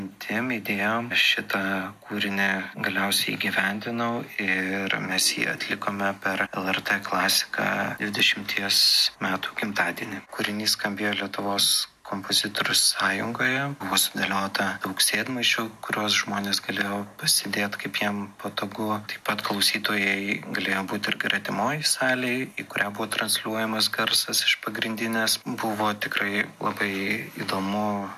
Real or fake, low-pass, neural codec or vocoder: real; 14.4 kHz; none